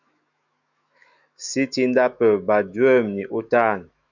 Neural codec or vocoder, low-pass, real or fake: autoencoder, 48 kHz, 128 numbers a frame, DAC-VAE, trained on Japanese speech; 7.2 kHz; fake